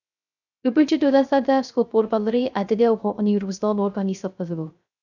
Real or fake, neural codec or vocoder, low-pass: fake; codec, 16 kHz, 0.3 kbps, FocalCodec; 7.2 kHz